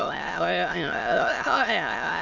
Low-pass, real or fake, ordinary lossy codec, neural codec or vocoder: 7.2 kHz; fake; none; autoencoder, 22.05 kHz, a latent of 192 numbers a frame, VITS, trained on many speakers